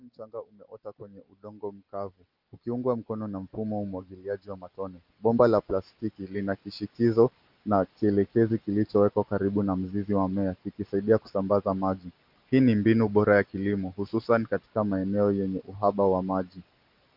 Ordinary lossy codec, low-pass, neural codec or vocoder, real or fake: Opus, 32 kbps; 5.4 kHz; none; real